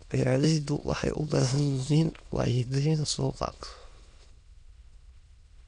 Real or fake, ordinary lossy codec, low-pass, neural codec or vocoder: fake; none; 9.9 kHz; autoencoder, 22.05 kHz, a latent of 192 numbers a frame, VITS, trained on many speakers